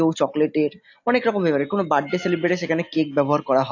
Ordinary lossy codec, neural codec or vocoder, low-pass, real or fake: AAC, 48 kbps; none; 7.2 kHz; real